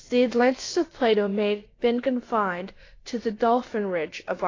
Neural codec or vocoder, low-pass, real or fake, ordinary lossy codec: codec, 16 kHz, about 1 kbps, DyCAST, with the encoder's durations; 7.2 kHz; fake; AAC, 32 kbps